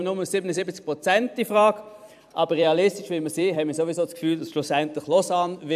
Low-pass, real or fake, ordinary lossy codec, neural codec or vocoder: 14.4 kHz; fake; AAC, 96 kbps; vocoder, 44.1 kHz, 128 mel bands every 256 samples, BigVGAN v2